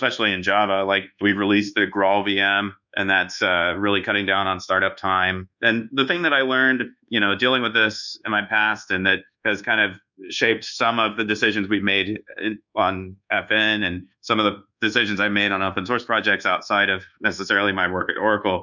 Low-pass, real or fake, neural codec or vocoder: 7.2 kHz; fake; codec, 24 kHz, 1.2 kbps, DualCodec